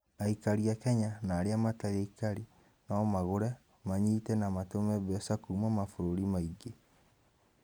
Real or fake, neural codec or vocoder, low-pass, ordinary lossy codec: real; none; none; none